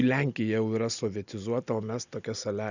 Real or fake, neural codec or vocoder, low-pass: real; none; 7.2 kHz